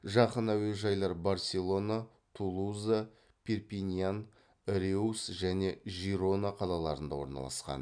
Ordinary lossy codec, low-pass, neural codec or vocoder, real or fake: MP3, 96 kbps; 9.9 kHz; none; real